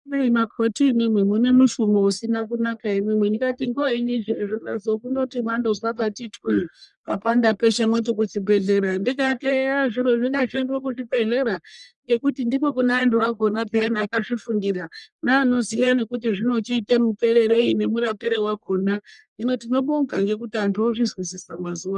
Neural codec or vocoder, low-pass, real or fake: codec, 44.1 kHz, 1.7 kbps, Pupu-Codec; 10.8 kHz; fake